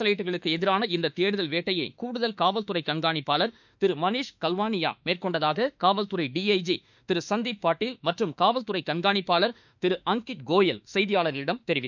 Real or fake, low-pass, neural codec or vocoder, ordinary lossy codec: fake; 7.2 kHz; autoencoder, 48 kHz, 32 numbers a frame, DAC-VAE, trained on Japanese speech; none